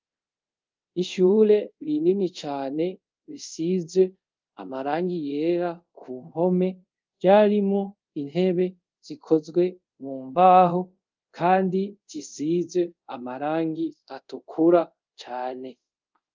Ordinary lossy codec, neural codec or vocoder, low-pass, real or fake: Opus, 24 kbps; codec, 24 kHz, 0.5 kbps, DualCodec; 7.2 kHz; fake